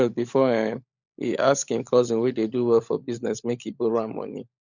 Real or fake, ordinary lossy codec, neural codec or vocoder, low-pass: fake; none; codec, 16 kHz, 16 kbps, FunCodec, trained on LibriTTS, 50 frames a second; 7.2 kHz